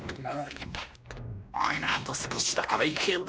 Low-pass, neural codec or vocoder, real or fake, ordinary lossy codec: none; codec, 16 kHz, 1 kbps, X-Codec, WavLM features, trained on Multilingual LibriSpeech; fake; none